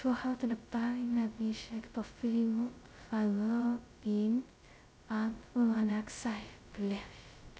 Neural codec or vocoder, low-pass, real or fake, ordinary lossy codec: codec, 16 kHz, 0.2 kbps, FocalCodec; none; fake; none